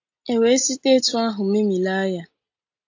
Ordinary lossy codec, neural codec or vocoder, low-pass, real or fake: AAC, 48 kbps; none; 7.2 kHz; real